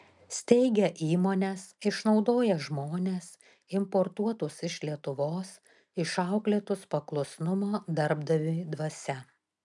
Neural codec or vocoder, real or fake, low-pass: none; real; 10.8 kHz